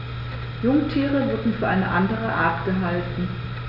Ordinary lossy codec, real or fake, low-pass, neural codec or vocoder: none; real; 5.4 kHz; none